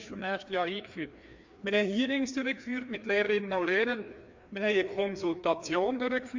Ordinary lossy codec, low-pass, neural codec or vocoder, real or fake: MP3, 48 kbps; 7.2 kHz; codec, 16 kHz, 2 kbps, FreqCodec, larger model; fake